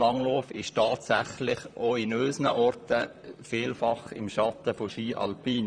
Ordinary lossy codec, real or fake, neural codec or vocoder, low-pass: none; fake; vocoder, 44.1 kHz, 128 mel bands, Pupu-Vocoder; 9.9 kHz